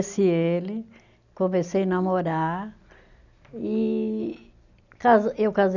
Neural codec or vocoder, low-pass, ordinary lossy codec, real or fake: none; 7.2 kHz; none; real